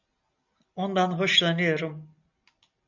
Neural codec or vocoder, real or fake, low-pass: none; real; 7.2 kHz